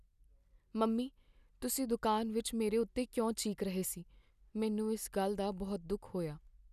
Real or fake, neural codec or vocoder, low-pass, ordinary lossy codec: real; none; 14.4 kHz; none